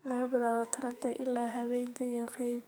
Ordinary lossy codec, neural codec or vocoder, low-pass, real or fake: none; codec, 44.1 kHz, 2.6 kbps, SNAC; none; fake